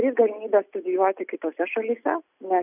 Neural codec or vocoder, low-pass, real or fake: none; 3.6 kHz; real